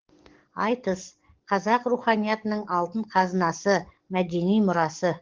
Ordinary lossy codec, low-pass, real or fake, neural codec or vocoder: Opus, 16 kbps; 7.2 kHz; fake; codec, 44.1 kHz, 7.8 kbps, DAC